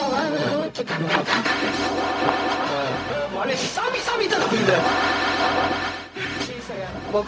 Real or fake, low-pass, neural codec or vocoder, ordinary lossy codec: fake; none; codec, 16 kHz, 0.4 kbps, LongCat-Audio-Codec; none